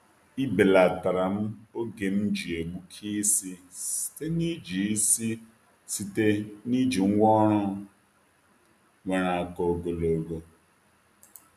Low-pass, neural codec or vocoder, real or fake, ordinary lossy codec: 14.4 kHz; none; real; none